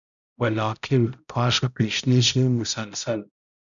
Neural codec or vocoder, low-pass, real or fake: codec, 16 kHz, 0.5 kbps, X-Codec, HuBERT features, trained on balanced general audio; 7.2 kHz; fake